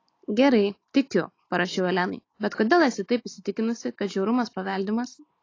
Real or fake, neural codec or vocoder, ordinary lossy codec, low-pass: real; none; AAC, 32 kbps; 7.2 kHz